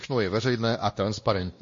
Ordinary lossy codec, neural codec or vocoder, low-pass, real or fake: MP3, 32 kbps; codec, 16 kHz, 1 kbps, X-Codec, WavLM features, trained on Multilingual LibriSpeech; 7.2 kHz; fake